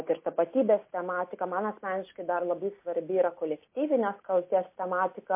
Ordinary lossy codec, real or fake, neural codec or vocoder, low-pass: MP3, 24 kbps; real; none; 3.6 kHz